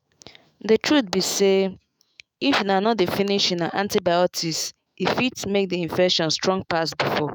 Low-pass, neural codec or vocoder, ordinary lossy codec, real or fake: none; autoencoder, 48 kHz, 128 numbers a frame, DAC-VAE, trained on Japanese speech; none; fake